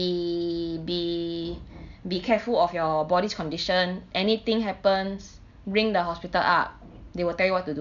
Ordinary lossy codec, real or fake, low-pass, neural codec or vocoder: none; real; 7.2 kHz; none